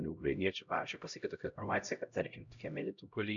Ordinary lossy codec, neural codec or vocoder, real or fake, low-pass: MP3, 96 kbps; codec, 16 kHz, 0.5 kbps, X-Codec, HuBERT features, trained on LibriSpeech; fake; 7.2 kHz